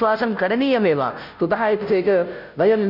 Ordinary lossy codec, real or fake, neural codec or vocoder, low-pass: none; fake; codec, 16 kHz, 0.5 kbps, FunCodec, trained on Chinese and English, 25 frames a second; 5.4 kHz